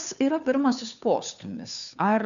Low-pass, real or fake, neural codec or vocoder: 7.2 kHz; fake; codec, 16 kHz, 2 kbps, FunCodec, trained on Chinese and English, 25 frames a second